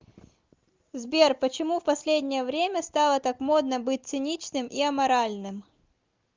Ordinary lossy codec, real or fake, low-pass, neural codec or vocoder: Opus, 24 kbps; real; 7.2 kHz; none